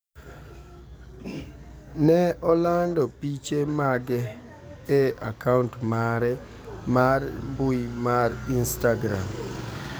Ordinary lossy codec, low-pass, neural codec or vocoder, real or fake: none; none; codec, 44.1 kHz, 7.8 kbps, DAC; fake